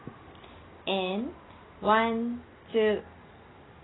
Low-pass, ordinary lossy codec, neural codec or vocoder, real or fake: 7.2 kHz; AAC, 16 kbps; none; real